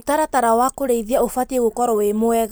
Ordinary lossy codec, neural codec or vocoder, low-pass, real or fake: none; none; none; real